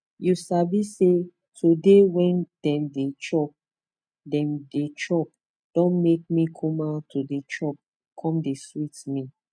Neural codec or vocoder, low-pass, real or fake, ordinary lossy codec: none; 9.9 kHz; real; none